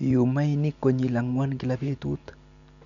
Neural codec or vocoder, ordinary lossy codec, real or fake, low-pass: none; none; real; 7.2 kHz